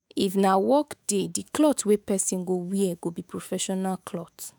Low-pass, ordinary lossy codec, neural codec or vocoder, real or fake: none; none; autoencoder, 48 kHz, 128 numbers a frame, DAC-VAE, trained on Japanese speech; fake